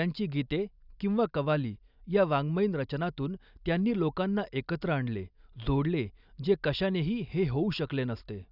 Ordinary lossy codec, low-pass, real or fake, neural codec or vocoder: none; 5.4 kHz; real; none